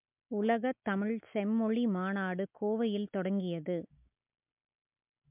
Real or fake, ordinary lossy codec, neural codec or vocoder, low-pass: real; MP3, 32 kbps; none; 3.6 kHz